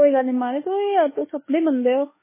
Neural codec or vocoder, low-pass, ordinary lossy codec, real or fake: autoencoder, 48 kHz, 32 numbers a frame, DAC-VAE, trained on Japanese speech; 3.6 kHz; MP3, 16 kbps; fake